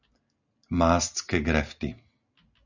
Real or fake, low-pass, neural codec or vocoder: real; 7.2 kHz; none